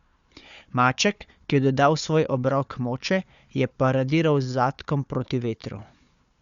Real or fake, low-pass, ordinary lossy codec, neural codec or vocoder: fake; 7.2 kHz; Opus, 64 kbps; codec, 16 kHz, 4 kbps, FunCodec, trained on Chinese and English, 50 frames a second